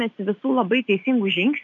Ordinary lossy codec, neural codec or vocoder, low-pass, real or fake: MP3, 96 kbps; none; 7.2 kHz; real